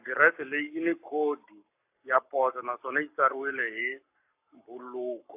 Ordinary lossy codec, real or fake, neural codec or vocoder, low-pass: AAC, 32 kbps; fake; codec, 44.1 kHz, 7.8 kbps, Pupu-Codec; 3.6 kHz